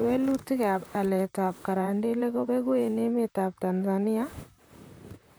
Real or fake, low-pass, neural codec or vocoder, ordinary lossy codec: fake; none; vocoder, 44.1 kHz, 128 mel bands, Pupu-Vocoder; none